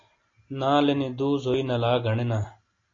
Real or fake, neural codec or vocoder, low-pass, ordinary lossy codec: real; none; 7.2 kHz; AAC, 32 kbps